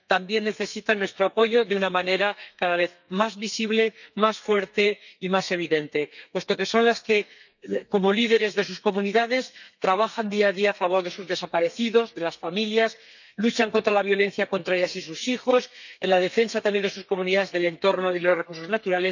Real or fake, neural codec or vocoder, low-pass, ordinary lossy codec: fake; codec, 32 kHz, 1.9 kbps, SNAC; 7.2 kHz; none